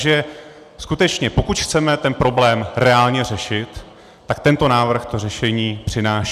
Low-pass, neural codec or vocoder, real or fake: 14.4 kHz; none; real